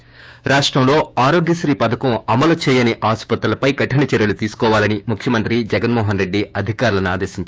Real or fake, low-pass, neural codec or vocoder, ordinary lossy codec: fake; none; codec, 16 kHz, 6 kbps, DAC; none